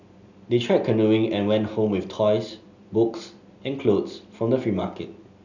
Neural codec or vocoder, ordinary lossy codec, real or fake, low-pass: none; none; real; 7.2 kHz